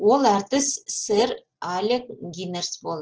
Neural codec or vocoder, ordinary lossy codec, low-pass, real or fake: none; Opus, 16 kbps; 7.2 kHz; real